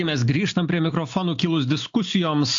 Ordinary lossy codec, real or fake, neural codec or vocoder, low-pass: AAC, 64 kbps; real; none; 7.2 kHz